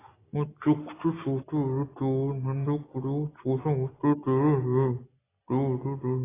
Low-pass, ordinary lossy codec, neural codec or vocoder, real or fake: 3.6 kHz; AAC, 16 kbps; none; real